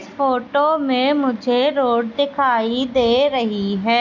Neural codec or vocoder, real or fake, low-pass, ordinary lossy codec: none; real; 7.2 kHz; none